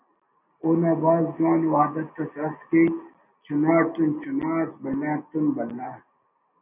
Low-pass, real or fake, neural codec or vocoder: 3.6 kHz; real; none